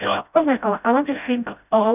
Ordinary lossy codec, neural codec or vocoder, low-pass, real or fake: none; codec, 16 kHz, 0.5 kbps, FreqCodec, smaller model; 3.6 kHz; fake